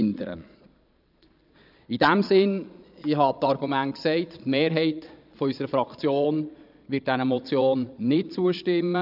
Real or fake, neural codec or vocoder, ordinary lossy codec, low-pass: fake; vocoder, 22.05 kHz, 80 mel bands, Vocos; none; 5.4 kHz